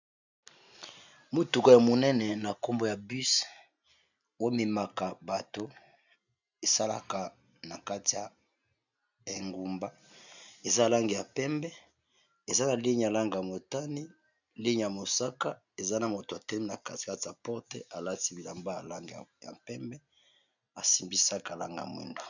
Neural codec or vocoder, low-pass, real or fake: none; 7.2 kHz; real